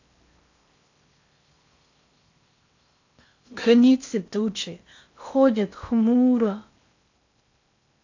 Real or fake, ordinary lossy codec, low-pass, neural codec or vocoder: fake; AAC, 48 kbps; 7.2 kHz; codec, 16 kHz in and 24 kHz out, 0.6 kbps, FocalCodec, streaming, 4096 codes